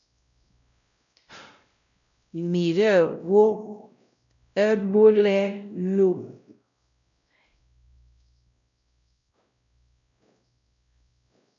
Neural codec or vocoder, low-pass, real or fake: codec, 16 kHz, 0.5 kbps, X-Codec, WavLM features, trained on Multilingual LibriSpeech; 7.2 kHz; fake